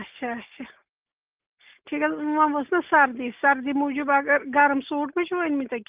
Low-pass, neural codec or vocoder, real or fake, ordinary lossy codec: 3.6 kHz; none; real; Opus, 24 kbps